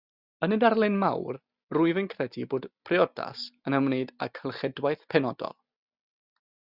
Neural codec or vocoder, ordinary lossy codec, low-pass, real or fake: none; Opus, 64 kbps; 5.4 kHz; real